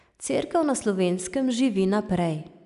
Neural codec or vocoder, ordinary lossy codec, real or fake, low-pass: none; none; real; 10.8 kHz